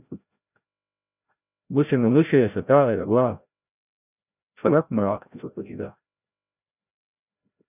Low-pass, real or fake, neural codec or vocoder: 3.6 kHz; fake; codec, 16 kHz, 0.5 kbps, FreqCodec, larger model